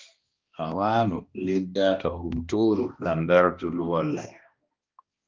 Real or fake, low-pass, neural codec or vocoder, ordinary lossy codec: fake; 7.2 kHz; codec, 16 kHz, 1 kbps, X-Codec, HuBERT features, trained on balanced general audio; Opus, 32 kbps